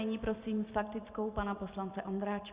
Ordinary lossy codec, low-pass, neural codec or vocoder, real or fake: Opus, 64 kbps; 3.6 kHz; none; real